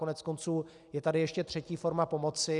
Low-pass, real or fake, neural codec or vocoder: 10.8 kHz; real; none